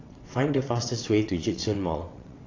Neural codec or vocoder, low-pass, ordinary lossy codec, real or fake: vocoder, 22.05 kHz, 80 mel bands, WaveNeXt; 7.2 kHz; AAC, 32 kbps; fake